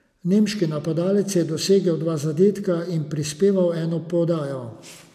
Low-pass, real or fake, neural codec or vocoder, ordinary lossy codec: 14.4 kHz; real; none; none